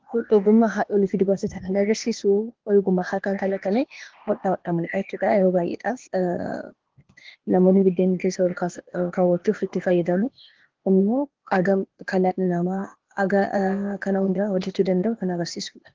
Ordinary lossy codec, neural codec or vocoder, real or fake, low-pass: Opus, 16 kbps; codec, 16 kHz, 0.8 kbps, ZipCodec; fake; 7.2 kHz